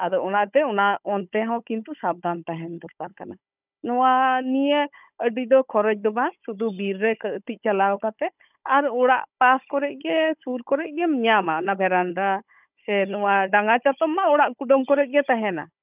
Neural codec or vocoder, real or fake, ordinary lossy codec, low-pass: codec, 16 kHz, 16 kbps, FunCodec, trained on Chinese and English, 50 frames a second; fake; none; 3.6 kHz